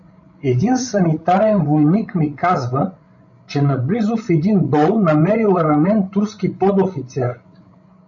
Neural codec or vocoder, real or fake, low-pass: codec, 16 kHz, 16 kbps, FreqCodec, larger model; fake; 7.2 kHz